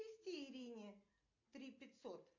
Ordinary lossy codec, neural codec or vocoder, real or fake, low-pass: MP3, 48 kbps; none; real; 7.2 kHz